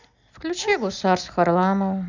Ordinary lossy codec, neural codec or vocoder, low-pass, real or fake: none; none; 7.2 kHz; real